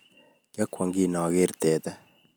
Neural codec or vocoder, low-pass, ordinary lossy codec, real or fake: none; none; none; real